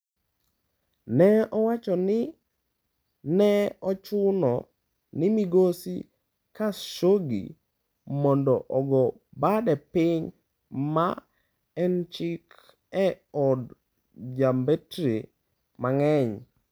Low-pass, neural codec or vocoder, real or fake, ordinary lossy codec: none; none; real; none